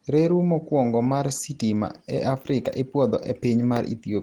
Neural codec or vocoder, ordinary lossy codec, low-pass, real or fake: none; Opus, 16 kbps; 19.8 kHz; real